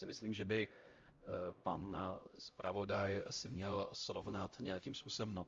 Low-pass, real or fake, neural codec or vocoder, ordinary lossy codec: 7.2 kHz; fake; codec, 16 kHz, 0.5 kbps, X-Codec, HuBERT features, trained on LibriSpeech; Opus, 32 kbps